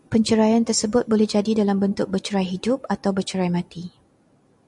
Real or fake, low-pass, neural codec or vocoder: real; 10.8 kHz; none